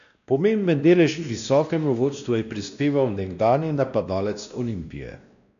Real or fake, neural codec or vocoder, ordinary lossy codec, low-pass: fake; codec, 16 kHz, 1 kbps, X-Codec, WavLM features, trained on Multilingual LibriSpeech; none; 7.2 kHz